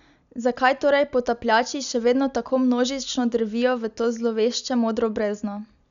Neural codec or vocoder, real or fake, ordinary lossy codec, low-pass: none; real; none; 7.2 kHz